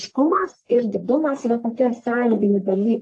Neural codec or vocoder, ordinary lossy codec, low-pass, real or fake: codec, 44.1 kHz, 1.7 kbps, Pupu-Codec; AAC, 48 kbps; 10.8 kHz; fake